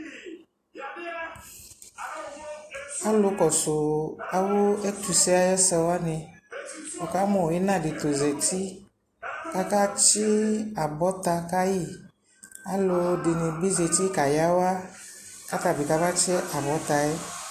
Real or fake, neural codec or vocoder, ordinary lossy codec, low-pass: real; none; AAC, 48 kbps; 14.4 kHz